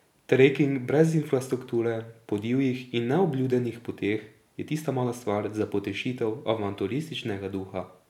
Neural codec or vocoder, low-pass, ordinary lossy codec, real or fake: none; 19.8 kHz; none; real